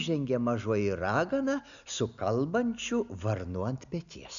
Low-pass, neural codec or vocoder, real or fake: 7.2 kHz; none; real